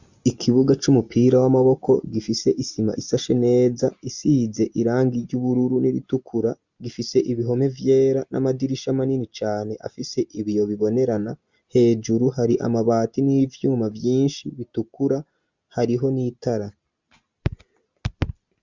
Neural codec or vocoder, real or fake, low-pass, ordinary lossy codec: none; real; 7.2 kHz; Opus, 64 kbps